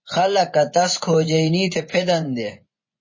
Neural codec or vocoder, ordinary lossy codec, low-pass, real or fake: vocoder, 24 kHz, 100 mel bands, Vocos; MP3, 32 kbps; 7.2 kHz; fake